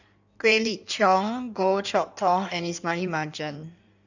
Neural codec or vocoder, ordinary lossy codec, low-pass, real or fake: codec, 16 kHz in and 24 kHz out, 1.1 kbps, FireRedTTS-2 codec; none; 7.2 kHz; fake